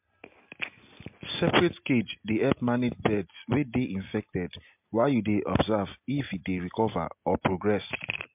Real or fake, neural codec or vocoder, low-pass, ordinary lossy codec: real; none; 3.6 kHz; MP3, 32 kbps